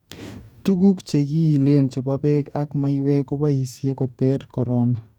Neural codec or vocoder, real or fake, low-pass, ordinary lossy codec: codec, 44.1 kHz, 2.6 kbps, DAC; fake; 19.8 kHz; none